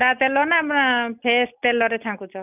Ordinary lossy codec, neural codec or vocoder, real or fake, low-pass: none; none; real; 3.6 kHz